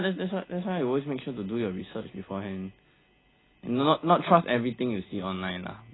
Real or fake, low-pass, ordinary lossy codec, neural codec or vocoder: real; 7.2 kHz; AAC, 16 kbps; none